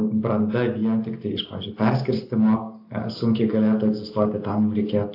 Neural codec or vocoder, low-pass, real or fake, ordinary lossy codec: none; 5.4 kHz; real; AAC, 32 kbps